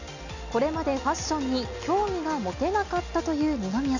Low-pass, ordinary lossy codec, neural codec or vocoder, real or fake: 7.2 kHz; none; none; real